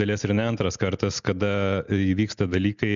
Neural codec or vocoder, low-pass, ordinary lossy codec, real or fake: none; 7.2 kHz; MP3, 96 kbps; real